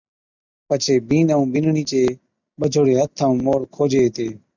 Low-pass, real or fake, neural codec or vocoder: 7.2 kHz; real; none